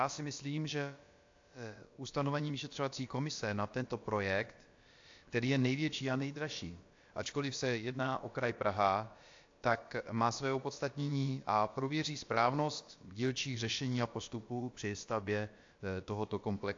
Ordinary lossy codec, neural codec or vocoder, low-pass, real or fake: AAC, 48 kbps; codec, 16 kHz, about 1 kbps, DyCAST, with the encoder's durations; 7.2 kHz; fake